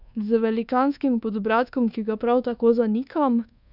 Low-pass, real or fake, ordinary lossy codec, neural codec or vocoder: 5.4 kHz; fake; none; codec, 24 kHz, 1.2 kbps, DualCodec